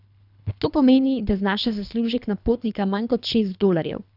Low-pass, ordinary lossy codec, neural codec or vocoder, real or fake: 5.4 kHz; none; codec, 24 kHz, 3 kbps, HILCodec; fake